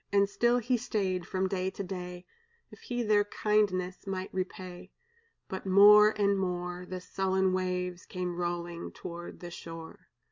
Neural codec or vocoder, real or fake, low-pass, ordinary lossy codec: none; real; 7.2 kHz; MP3, 64 kbps